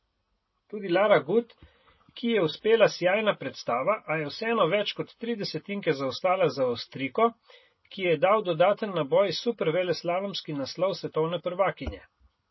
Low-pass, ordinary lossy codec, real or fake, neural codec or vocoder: 7.2 kHz; MP3, 24 kbps; real; none